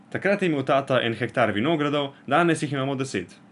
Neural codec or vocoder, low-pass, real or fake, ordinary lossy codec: none; 10.8 kHz; real; none